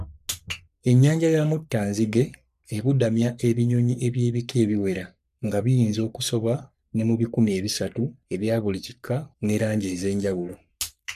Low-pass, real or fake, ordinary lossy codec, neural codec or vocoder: 14.4 kHz; fake; none; codec, 44.1 kHz, 3.4 kbps, Pupu-Codec